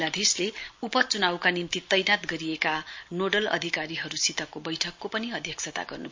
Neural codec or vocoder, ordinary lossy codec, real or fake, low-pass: none; MP3, 48 kbps; real; 7.2 kHz